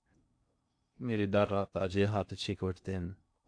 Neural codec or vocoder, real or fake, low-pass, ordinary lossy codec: codec, 16 kHz in and 24 kHz out, 0.6 kbps, FocalCodec, streaming, 2048 codes; fake; 9.9 kHz; AAC, 64 kbps